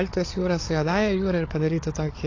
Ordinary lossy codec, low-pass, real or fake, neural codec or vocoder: AAC, 32 kbps; 7.2 kHz; fake; codec, 16 kHz, 8 kbps, FreqCodec, larger model